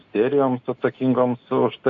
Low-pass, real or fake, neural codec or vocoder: 7.2 kHz; real; none